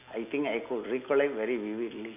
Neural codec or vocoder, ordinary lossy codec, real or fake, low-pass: none; none; real; 3.6 kHz